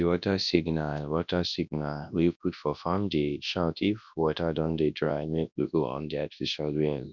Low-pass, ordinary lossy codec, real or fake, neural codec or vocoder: 7.2 kHz; none; fake; codec, 24 kHz, 0.9 kbps, WavTokenizer, large speech release